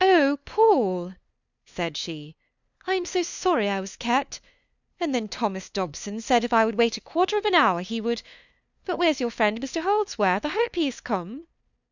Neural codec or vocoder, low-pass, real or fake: codec, 16 kHz, 2 kbps, FunCodec, trained on LibriTTS, 25 frames a second; 7.2 kHz; fake